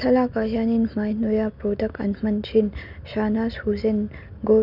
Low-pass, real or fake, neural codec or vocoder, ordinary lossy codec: 5.4 kHz; real; none; none